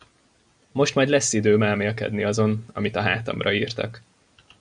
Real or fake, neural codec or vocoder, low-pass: real; none; 9.9 kHz